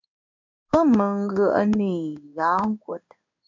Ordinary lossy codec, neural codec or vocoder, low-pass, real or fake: MP3, 64 kbps; codec, 16 kHz in and 24 kHz out, 1 kbps, XY-Tokenizer; 7.2 kHz; fake